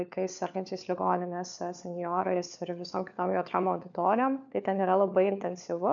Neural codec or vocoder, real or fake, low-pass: codec, 16 kHz, 4 kbps, FunCodec, trained on LibriTTS, 50 frames a second; fake; 7.2 kHz